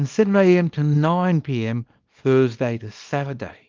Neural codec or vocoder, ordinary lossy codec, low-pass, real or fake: codec, 24 kHz, 0.9 kbps, WavTokenizer, small release; Opus, 32 kbps; 7.2 kHz; fake